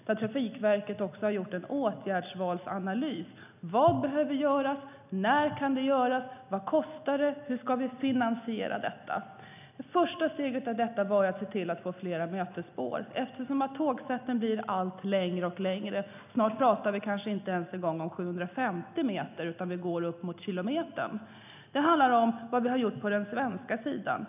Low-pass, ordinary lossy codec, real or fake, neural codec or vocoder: 3.6 kHz; none; real; none